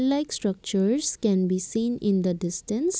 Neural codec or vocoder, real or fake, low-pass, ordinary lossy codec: none; real; none; none